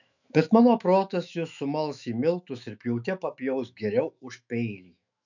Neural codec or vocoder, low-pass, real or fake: autoencoder, 48 kHz, 128 numbers a frame, DAC-VAE, trained on Japanese speech; 7.2 kHz; fake